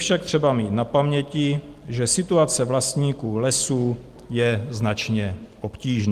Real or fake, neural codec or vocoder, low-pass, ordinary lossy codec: real; none; 14.4 kHz; Opus, 24 kbps